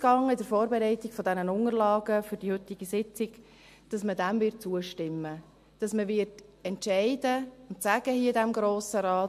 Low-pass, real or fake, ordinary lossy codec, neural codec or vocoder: 14.4 kHz; real; MP3, 64 kbps; none